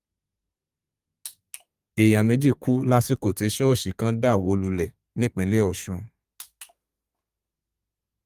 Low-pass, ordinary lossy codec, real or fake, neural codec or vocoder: 14.4 kHz; Opus, 32 kbps; fake; codec, 32 kHz, 1.9 kbps, SNAC